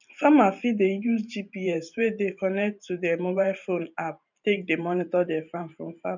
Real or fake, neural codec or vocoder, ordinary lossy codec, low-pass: fake; vocoder, 44.1 kHz, 128 mel bands every 512 samples, BigVGAN v2; none; 7.2 kHz